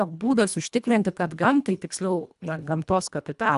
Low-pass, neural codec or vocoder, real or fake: 10.8 kHz; codec, 24 kHz, 1.5 kbps, HILCodec; fake